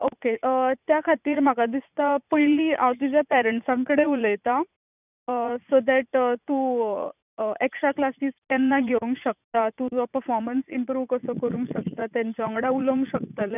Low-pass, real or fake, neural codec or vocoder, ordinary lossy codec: 3.6 kHz; fake; vocoder, 44.1 kHz, 80 mel bands, Vocos; none